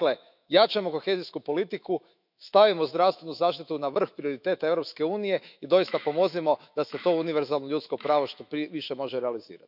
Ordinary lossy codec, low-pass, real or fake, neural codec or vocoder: none; 5.4 kHz; fake; autoencoder, 48 kHz, 128 numbers a frame, DAC-VAE, trained on Japanese speech